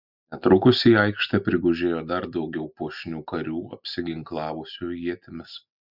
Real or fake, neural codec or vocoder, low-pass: real; none; 5.4 kHz